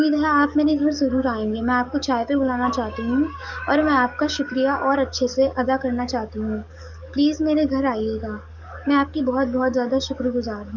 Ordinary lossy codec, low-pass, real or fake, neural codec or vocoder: none; 7.2 kHz; fake; codec, 44.1 kHz, 7.8 kbps, DAC